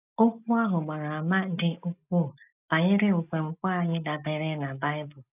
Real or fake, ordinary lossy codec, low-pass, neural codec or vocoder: real; none; 3.6 kHz; none